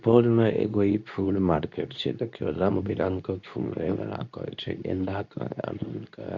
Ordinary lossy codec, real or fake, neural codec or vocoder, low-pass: none; fake; codec, 24 kHz, 0.9 kbps, WavTokenizer, medium speech release version 2; 7.2 kHz